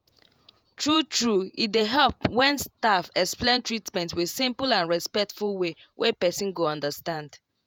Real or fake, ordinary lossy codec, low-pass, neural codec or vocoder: fake; none; none; vocoder, 48 kHz, 128 mel bands, Vocos